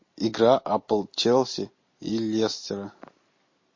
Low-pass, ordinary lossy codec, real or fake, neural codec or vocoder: 7.2 kHz; MP3, 32 kbps; real; none